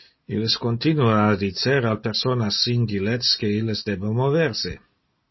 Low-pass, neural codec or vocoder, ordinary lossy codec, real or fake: 7.2 kHz; none; MP3, 24 kbps; real